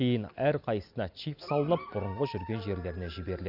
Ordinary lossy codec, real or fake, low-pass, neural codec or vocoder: Opus, 64 kbps; real; 5.4 kHz; none